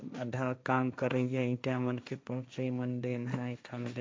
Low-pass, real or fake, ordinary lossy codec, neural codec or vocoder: none; fake; none; codec, 16 kHz, 1.1 kbps, Voila-Tokenizer